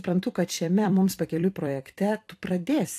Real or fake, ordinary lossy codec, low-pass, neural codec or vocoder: fake; AAC, 64 kbps; 14.4 kHz; vocoder, 44.1 kHz, 128 mel bands every 256 samples, BigVGAN v2